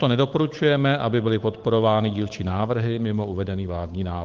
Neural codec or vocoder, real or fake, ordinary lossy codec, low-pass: codec, 16 kHz, 8 kbps, FunCodec, trained on Chinese and English, 25 frames a second; fake; Opus, 24 kbps; 7.2 kHz